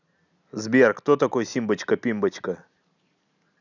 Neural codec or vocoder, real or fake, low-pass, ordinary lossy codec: none; real; 7.2 kHz; none